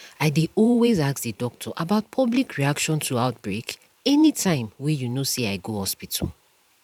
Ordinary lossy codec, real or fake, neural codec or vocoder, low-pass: none; fake; vocoder, 44.1 kHz, 128 mel bands every 512 samples, BigVGAN v2; 19.8 kHz